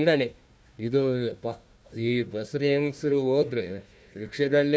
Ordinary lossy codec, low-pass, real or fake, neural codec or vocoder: none; none; fake; codec, 16 kHz, 1 kbps, FunCodec, trained on Chinese and English, 50 frames a second